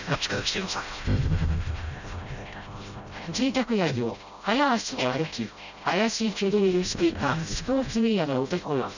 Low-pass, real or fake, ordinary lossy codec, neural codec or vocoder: 7.2 kHz; fake; none; codec, 16 kHz, 0.5 kbps, FreqCodec, smaller model